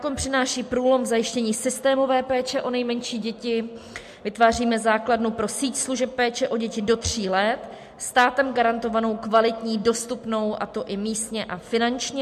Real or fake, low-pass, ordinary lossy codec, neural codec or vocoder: real; 14.4 kHz; MP3, 64 kbps; none